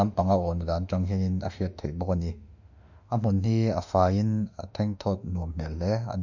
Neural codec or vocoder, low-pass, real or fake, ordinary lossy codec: autoencoder, 48 kHz, 32 numbers a frame, DAC-VAE, trained on Japanese speech; 7.2 kHz; fake; none